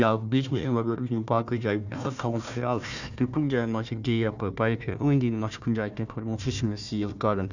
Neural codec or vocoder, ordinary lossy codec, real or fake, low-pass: codec, 16 kHz, 1 kbps, FunCodec, trained on Chinese and English, 50 frames a second; none; fake; 7.2 kHz